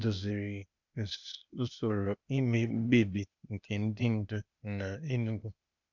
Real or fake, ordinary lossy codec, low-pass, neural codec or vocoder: fake; none; 7.2 kHz; codec, 16 kHz, 0.8 kbps, ZipCodec